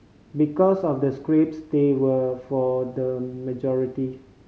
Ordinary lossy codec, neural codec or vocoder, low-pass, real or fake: none; none; none; real